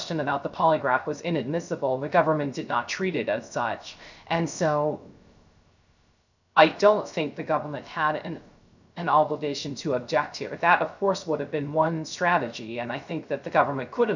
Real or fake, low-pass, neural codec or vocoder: fake; 7.2 kHz; codec, 16 kHz, 0.3 kbps, FocalCodec